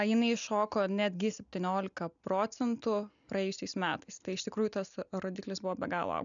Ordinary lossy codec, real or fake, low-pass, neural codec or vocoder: MP3, 96 kbps; real; 7.2 kHz; none